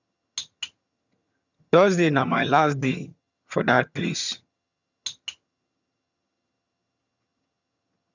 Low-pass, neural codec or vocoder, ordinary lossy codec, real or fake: 7.2 kHz; vocoder, 22.05 kHz, 80 mel bands, HiFi-GAN; none; fake